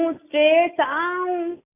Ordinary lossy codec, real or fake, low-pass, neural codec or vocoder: none; real; 3.6 kHz; none